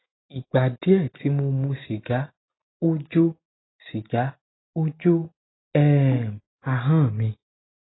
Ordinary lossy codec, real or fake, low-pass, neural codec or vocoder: AAC, 16 kbps; real; 7.2 kHz; none